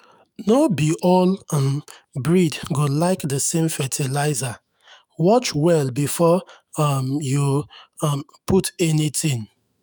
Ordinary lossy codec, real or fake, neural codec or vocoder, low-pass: none; fake; autoencoder, 48 kHz, 128 numbers a frame, DAC-VAE, trained on Japanese speech; none